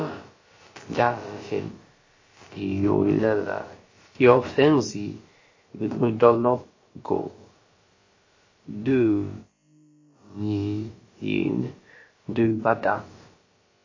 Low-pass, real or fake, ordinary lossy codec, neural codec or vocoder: 7.2 kHz; fake; MP3, 32 kbps; codec, 16 kHz, about 1 kbps, DyCAST, with the encoder's durations